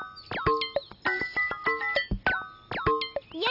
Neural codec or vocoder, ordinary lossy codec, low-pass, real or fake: none; none; 5.4 kHz; real